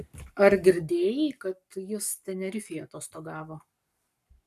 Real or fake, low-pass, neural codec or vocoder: fake; 14.4 kHz; codec, 44.1 kHz, 7.8 kbps, Pupu-Codec